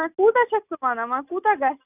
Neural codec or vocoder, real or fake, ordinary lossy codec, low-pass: none; real; none; 3.6 kHz